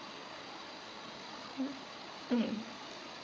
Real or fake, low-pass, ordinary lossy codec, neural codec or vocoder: fake; none; none; codec, 16 kHz, 8 kbps, FreqCodec, larger model